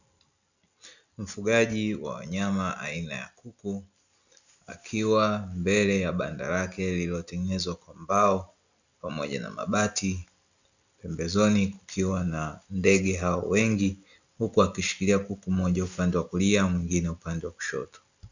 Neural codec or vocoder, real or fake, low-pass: none; real; 7.2 kHz